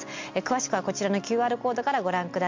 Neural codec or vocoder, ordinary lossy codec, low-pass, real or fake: none; MP3, 48 kbps; 7.2 kHz; real